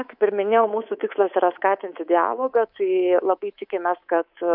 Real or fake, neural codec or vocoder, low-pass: fake; codec, 24 kHz, 3.1 kbps, DualCodec; 5.4 kHz